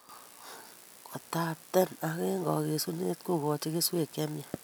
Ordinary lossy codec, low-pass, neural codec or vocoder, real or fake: none; none; none; real